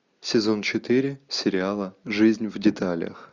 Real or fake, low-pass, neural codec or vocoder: real; 7.2 kHz; none